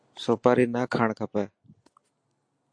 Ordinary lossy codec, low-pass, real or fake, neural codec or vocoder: AAC, 64 kbps; 9.9 kHz; real; none